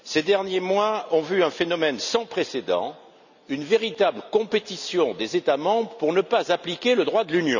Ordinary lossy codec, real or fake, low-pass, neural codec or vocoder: none; real; 7.2 kHz; none